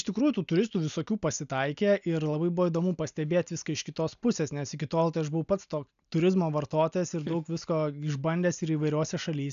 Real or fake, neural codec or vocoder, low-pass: real; none; 7.2 kHz